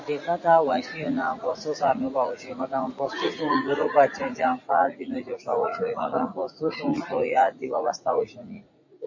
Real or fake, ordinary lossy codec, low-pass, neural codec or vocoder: fake; MP3, 32 kbps; 7.2 kHz; vocoder, 44.1 kHz, 80 mel bands, Vocos